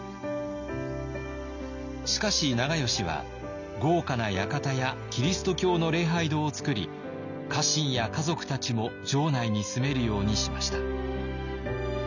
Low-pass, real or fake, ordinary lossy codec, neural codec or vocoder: 7.2 kHz; real; none; none